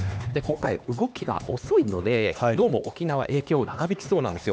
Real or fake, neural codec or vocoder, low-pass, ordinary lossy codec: fake; codec, 16 kHz, 2 kbps, X-Codec, HuBERT features, trained on LibriSpeech; none; none